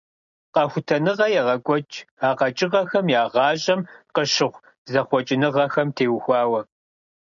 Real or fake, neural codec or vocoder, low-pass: real; none; 7.2 kHz